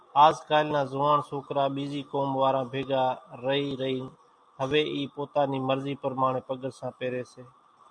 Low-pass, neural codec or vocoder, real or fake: 9.9 kHz; none; real